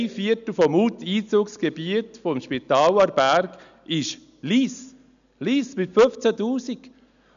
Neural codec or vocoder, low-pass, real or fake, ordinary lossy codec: none; 7.2 kHz; real; none